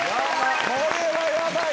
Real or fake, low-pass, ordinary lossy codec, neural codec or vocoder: real; none; none; none